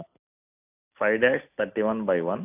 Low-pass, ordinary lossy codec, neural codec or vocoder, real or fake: 3.6 kHz; AAC, 32 kbps; none; real